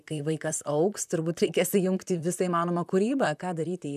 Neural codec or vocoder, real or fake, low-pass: vocoder, 44.1 kHz, 128 mel bands, Pupu-Vocoder; fake; 14.4 kHz